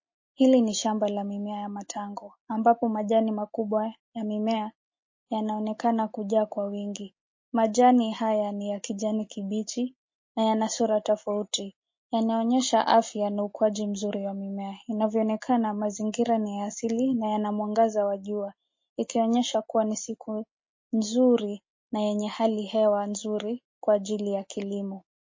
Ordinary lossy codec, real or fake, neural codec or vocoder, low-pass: MP3, 32 kbps; real; none; 7.2 kHz